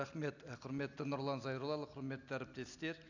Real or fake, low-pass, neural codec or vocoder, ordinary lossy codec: real; 7.2 kHz; none; none